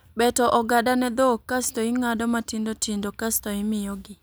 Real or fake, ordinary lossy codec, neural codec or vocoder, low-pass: real; none; none; none